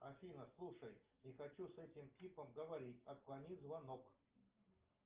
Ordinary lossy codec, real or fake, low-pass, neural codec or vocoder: Opus, 32 kbps; real; 3.6 kHz; none